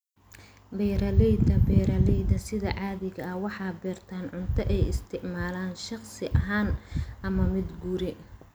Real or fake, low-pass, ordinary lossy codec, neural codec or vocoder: real; none; none; none